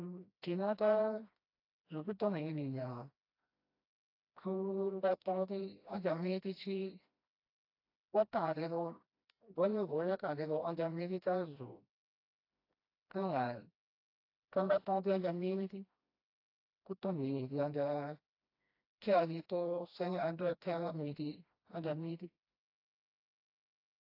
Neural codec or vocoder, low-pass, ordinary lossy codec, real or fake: codec, 16 kHz, 1 kbps, FreqCodec, smaller model; 5.4 kHz; AAC, 32 kbps; fake